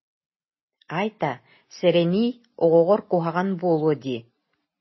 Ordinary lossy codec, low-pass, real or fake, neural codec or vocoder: MP3, 24 kbps; 7.2 kHz; real; none